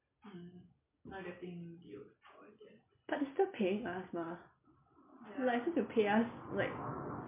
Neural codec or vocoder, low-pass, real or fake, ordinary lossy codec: none; 3.6 kHz; real; MP3, 24 kbps